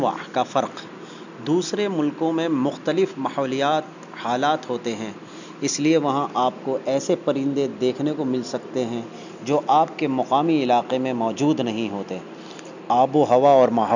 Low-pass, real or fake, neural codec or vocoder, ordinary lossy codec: 7.2 kHz; real; none; none